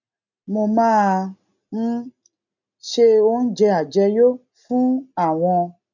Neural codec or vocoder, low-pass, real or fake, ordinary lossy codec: none; 7.2 kHz; real; none